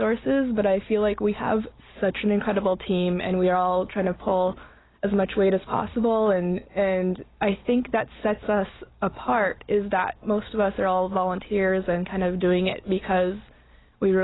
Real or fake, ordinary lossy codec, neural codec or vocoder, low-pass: real; AAC, 16 kbps; none; 7.2 kHz